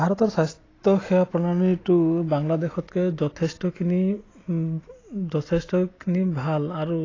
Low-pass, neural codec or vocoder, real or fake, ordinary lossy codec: 7.2 kHz; none; real; AAC, 32 kbps